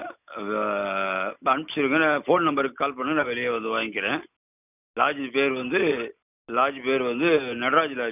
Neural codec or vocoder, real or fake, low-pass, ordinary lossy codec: none; real; 3.6 kHz; none